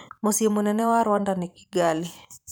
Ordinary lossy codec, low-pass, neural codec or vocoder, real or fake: none; none; vocoder, 44.1 kHz, 128 mel bands every 512 samples, BigVGAN v2; fake